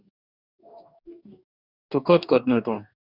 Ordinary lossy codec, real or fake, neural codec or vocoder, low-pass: Opus, 64 kbps; fake; codec, 44.1 kHz, 2.6 kbps, DAC; 5.4 kHz